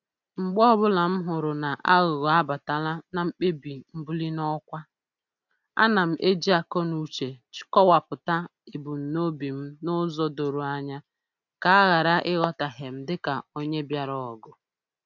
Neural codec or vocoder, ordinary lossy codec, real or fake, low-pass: none; none; real; 7.2 kHz